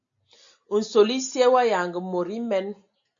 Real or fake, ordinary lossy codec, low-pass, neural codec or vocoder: real; AAC, 64 kbps; 7.2 kHz; none